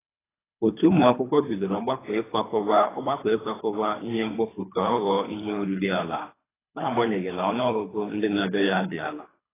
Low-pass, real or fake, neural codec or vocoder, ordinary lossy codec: 3.6 kHz; fake; codec, 24 kHz, 3 kbps, HILCodec; AAC, 16 kbps